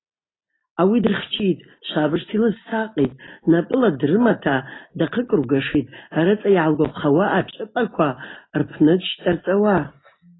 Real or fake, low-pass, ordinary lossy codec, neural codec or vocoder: real; 7.2 kHz; AAC, 16 kbps; none